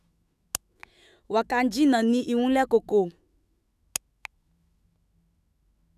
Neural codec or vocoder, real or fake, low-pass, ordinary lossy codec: autoencoder, 48 kHz, 128 numbers a frame, DAC-VAE, trained on Japanese speech; fake; 14.4 kHz; none